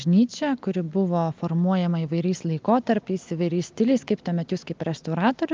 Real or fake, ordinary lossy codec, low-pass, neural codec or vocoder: real; Opus, 24 kbps; 7.2 kHz; none